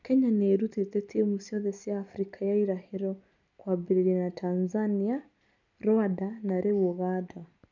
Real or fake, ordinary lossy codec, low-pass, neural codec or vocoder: real; none; 7.2 kHz; none